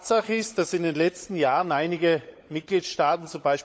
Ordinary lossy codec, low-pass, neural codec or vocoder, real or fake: none; none; codec, 16 kHz, 16 kbps, FunCodec, trained on LibriTTS, 50 frames a second; fake